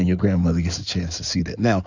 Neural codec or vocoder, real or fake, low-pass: codec, 16 kHz in and 24 kHz out, 2.2 kbps, FireRedTTS-2 codec; fake; 7.2 kHz